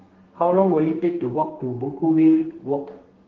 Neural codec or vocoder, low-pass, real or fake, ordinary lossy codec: codec, 32 kHz, 1.9 kbps, SNAC; 7.2 kHz; fake; Opus, 16 kbps